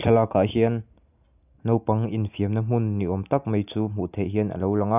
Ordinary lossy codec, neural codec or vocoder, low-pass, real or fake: none; none; 3.6 kHz; real